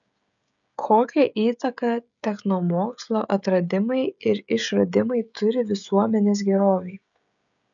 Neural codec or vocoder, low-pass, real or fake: codec, 16 kHz, 16 kbps, FreqCodec, smaller model; 7.2 kHz; fake